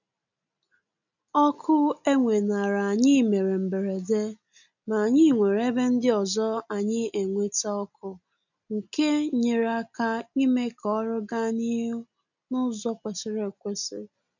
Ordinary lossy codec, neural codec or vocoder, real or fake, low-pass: none; none; real; 7.2 kHz